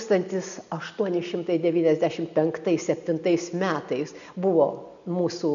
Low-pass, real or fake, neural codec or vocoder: 7.2 kHz; real; none